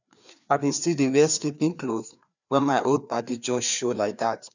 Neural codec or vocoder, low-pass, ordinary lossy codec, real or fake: codec, 16 kHz, 2 kbps, FreqCodec, larger model; 7.2 kHz; none; fake